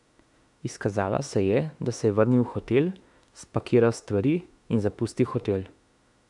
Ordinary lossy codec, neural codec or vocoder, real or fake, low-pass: none; autoencoder, 48 kHz, 32 numbers a frame, DAC-VAE, trained on Japanese speech; fake; 10.8 kHz